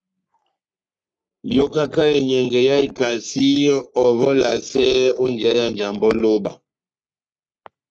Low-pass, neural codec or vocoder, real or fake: 9.9 kHz; codec, 44.1 kHz, 3.4 kbps, Pupu-Codec; fake